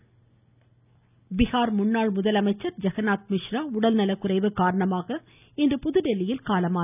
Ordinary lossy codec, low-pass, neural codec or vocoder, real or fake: none; 3.6 kHz; none; real